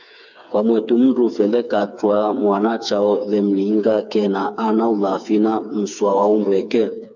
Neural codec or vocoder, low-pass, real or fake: codec, 16 kHz, 4 kbps, FreqCodec, smaller model; 7.2 kHz; fake